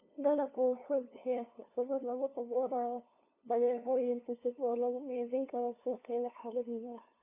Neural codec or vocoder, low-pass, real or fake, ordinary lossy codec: codec, 16 kHz, 2 kbps, FunCodec, trained on LibriTTS, 25 frames a second; 3.6 kHz; fake; none